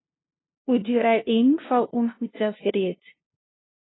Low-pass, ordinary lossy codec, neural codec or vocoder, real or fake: 7.2 kHz; AAC, 16 kbps; codec, 16 kHz, 0.5 kbps, FunCodec, trained on LibriTTS, 25 frames a second; fake